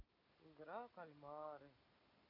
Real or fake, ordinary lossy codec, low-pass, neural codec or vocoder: real; none; 5.4 kHz; none